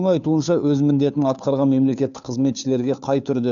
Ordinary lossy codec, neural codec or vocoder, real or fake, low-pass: none; codec, 16 kHz, 2 kbps, FunCodec, trained on Chinese and English, 25 frames a second; fake; 7.2 kHz